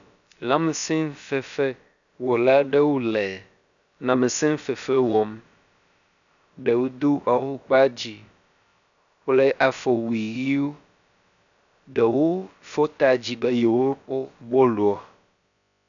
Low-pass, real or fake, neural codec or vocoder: 7.2 kHz; fake; codec, 16 kHz, about 1 kbps, DyCAST, with the encoder's durations